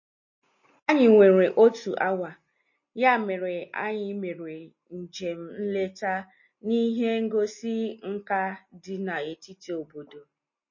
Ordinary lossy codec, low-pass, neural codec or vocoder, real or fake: MP3, 32 kbps; 7.2 kHz; none; real